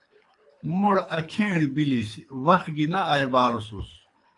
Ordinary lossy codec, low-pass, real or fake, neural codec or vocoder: AAC, 48 kbps; 10.8 kHz; fake; codec, 24 kHz, 3 kbps, HILCodec